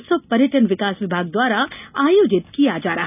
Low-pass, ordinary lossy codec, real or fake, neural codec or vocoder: 3.6 kHz; none; real; none